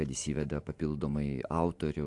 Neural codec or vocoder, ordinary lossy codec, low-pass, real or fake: vocoder, 44.1 kHz, 128 mel bands every 512 samples, BigVGAN v2; AAC, 64 kbps; 10.8 kHz; fake